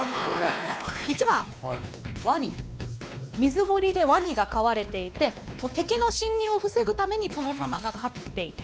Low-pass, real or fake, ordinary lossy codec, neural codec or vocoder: none; fake; none; codec, 16 kHz, 2 kbps, X-Codec, WavLM features, trained on Multilingual LibriSpeech